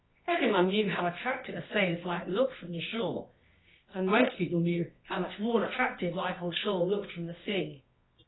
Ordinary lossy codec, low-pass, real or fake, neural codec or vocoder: AAC, 16 kbps; 7.2 kHz; fake; codec, 24 kHz, 0.9 kbps, WavTokenizer, medium music audio release